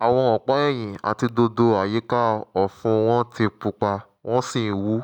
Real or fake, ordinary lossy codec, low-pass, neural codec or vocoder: real; none; 19.8 kHz; none